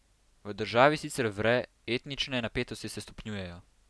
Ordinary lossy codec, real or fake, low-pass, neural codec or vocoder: none; real; none; none